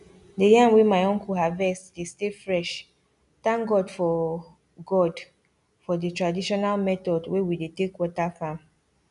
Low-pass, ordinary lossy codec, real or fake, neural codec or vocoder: 10.8 kHz; none; real; none